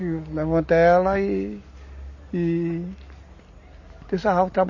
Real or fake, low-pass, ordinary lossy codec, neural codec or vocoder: real; 7.2 kHz; MP3, 32 kbps; none